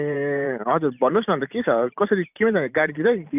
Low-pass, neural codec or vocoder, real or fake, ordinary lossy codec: 3.6 kHz; vocoder, 44.1 kHz, 128 mel bands every 512 samples, BigVGAN v2; fake; none